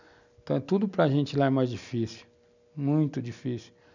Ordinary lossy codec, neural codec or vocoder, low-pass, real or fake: none; none; 7.2 kHz; real